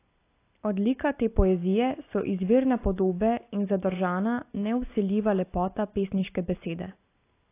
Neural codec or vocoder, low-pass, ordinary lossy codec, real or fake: none; 3.6 kHz; AAC, 24 kbps; real